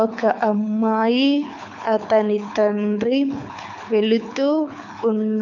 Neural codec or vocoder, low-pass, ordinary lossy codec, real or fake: codec, 24 kHz, 6 kbps, HILCodec; 7.2 kHz; none; fake